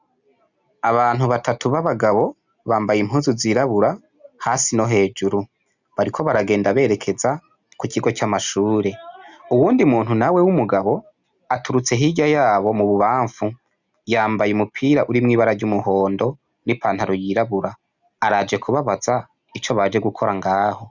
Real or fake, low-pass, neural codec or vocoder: real; 7.2 kHz; none